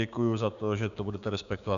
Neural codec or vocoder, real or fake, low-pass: codec, 16 kHz, 6 kbps, DAC; fake; 7.2 kHz